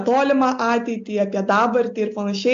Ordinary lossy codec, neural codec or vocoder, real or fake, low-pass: AAC, 64 kbps; none; real; 7.2 kHz